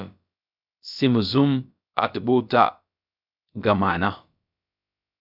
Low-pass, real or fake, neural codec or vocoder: 5.4 kHz; fake; codec, 16 kHz, about 1 kbps, DyCAST, with the encoder's durations